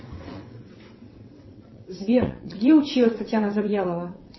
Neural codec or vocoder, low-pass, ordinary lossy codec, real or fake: vocoder, 22.05 kHz, 80 mel bands, WaveNeXt; 7.2 kHz; MP3, 24 kbps; fake